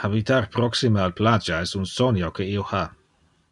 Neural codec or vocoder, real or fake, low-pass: none; real; 10.8 kHz